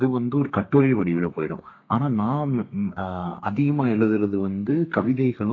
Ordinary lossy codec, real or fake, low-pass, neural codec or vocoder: AAC, 32 kbps; fake; 7.2 kHz; codec, 32 kHz, 1.9 kbps, SNAC